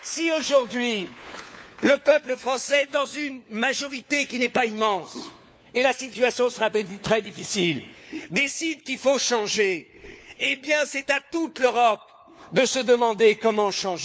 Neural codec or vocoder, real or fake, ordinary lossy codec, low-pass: codec, 16 kHz, 4 kbps, FunCodec, trained on LibriTTS, 50 frames a second; fake; none; none